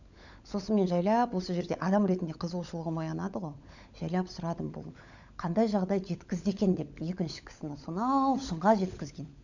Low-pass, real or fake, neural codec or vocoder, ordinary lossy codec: 7.2 kHz; fake; codec, 16 kHz, 16 kbps, FunCodec, trained on LibriTTS, 50 frames a second; none